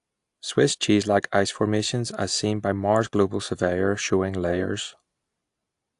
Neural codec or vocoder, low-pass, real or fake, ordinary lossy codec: none; 10.8 kHz; real; AAC, 64 kbps